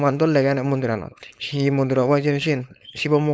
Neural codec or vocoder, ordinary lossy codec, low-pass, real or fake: codec, 16 kHz, 4.8 kbps, FACodec; none; none; fake